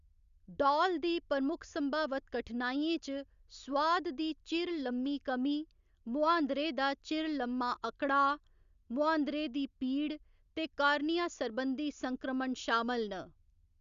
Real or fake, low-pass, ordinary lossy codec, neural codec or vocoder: real; 7.2 kHz; Opus, 64 kbps; none